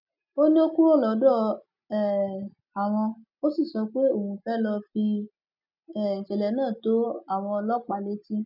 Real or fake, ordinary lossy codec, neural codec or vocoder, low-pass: real; none; none; 5.4 kHz